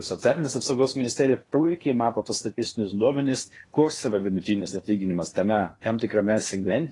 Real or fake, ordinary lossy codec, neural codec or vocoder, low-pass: fake; AAC, 32 kbps; codec, 16 kHz in and 24 kHz out, 0.8 kbps, FocalCodec, streaming, 65536 codes; 10.8 kHz